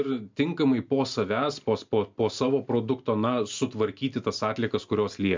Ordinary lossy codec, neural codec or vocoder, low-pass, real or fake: MP3, 64 kbps; none; 7.2 kHz; real